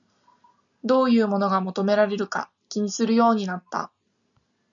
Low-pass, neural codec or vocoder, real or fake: 7.2 kHz; none; real